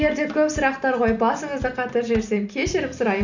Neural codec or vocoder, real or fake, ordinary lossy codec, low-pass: none; real; none; 7.2 kHz